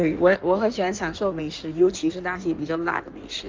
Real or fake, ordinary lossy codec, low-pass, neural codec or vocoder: fake; Opus, 24 kbps; 7.2 kHz; codec, 16 kHz in and 24 kHz out, 1.1 kbps, FireRedTTS-2 codec